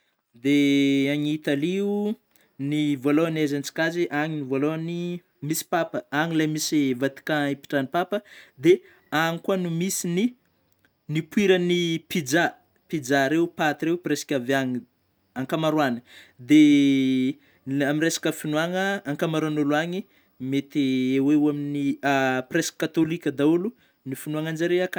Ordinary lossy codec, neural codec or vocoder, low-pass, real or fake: none; none; none; real